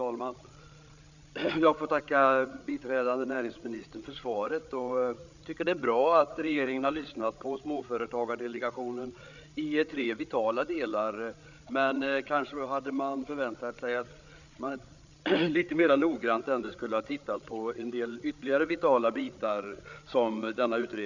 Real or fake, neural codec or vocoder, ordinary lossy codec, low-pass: fake; codec, 16 kHz, 8 kbps, FreqCodec, larger model; none; 7.2 kHz